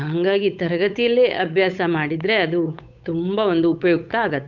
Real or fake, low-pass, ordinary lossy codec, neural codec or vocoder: fake; 7.2 kHz; none; codec, 16 kHz, 8 kbps, FunCodec, trained on Chinese and English, 25 frames a second